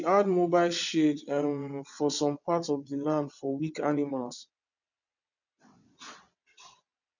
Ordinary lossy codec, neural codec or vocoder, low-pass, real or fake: none; vocoder, 22.05 kHz, 80 mel bands, WaveNeXt; 7.2 kHz; fake